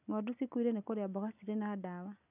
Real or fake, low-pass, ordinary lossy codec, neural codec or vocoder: real; 3.6 kHz; none; none